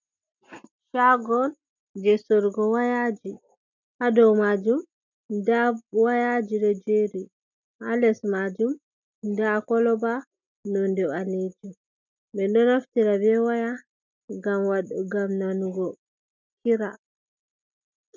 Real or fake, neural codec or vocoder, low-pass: real; none; 7.2 kHz